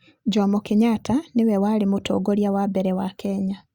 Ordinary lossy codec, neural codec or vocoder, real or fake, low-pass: Opus, 64 kbps; none; real; 19.8 kHz